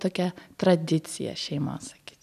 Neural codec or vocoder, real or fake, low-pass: none; real; 14.4 kHz